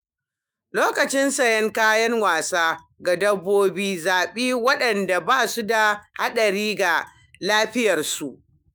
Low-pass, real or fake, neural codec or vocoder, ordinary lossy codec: none; fake; autoencoder, 48 kHz, 128 numbers a frame, DAC-VAE, trained on Japanese speech; none